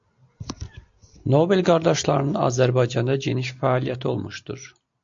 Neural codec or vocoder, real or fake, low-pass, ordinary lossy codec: none; real; 7.2 kHz; AAC, 64 kbps